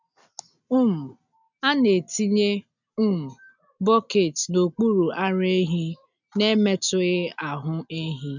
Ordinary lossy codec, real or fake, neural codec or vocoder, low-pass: none; real; none; 7.2 kHz